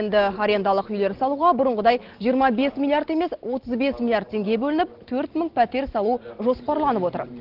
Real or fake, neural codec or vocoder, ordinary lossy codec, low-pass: real; none; Opus, 16 kbps; 5.4 kHz